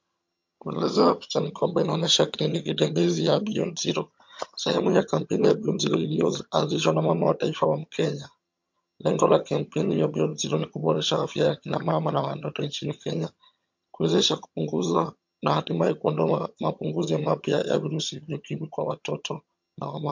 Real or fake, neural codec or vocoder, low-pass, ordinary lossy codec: fake; vocoder, 22.05 kHz, 80 mel bands, HiFi-GAN; 7.2 kHz; MP3, 48 kbps